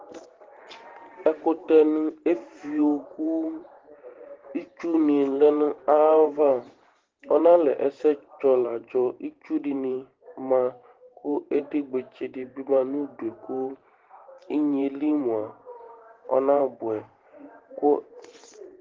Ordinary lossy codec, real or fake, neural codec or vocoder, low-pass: Opus, 16 kbps; fake; vocoder, 44.1 kHz, 128 mel bands every 512 samples, BigVGAN v2; 7.2 kHz